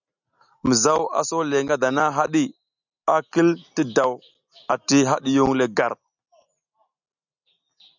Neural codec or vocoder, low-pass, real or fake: none; 7.2 kHz; real